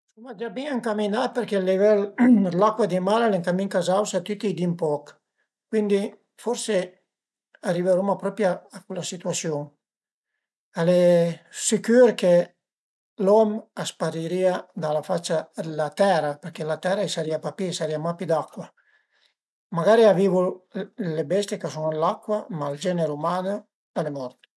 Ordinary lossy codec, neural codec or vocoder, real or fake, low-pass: none; none; real; none